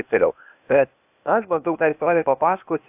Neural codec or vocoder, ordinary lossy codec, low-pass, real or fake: codec, 16 kHz, 0.8 kbps, ZipCodec; AAC, 32 kbps; 3.6 kHz; fake